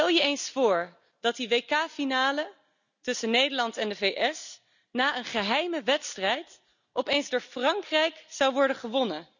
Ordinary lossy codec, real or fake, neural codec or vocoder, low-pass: none; real; none; 7.2 kHz